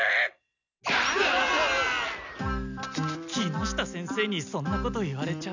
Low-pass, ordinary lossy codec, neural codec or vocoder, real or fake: 7.2 kHz; none; none; real